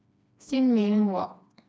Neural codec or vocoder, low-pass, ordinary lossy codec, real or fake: codec, 16 kHz, 2 kbps, FreqCodec, smaller model; none; none; fake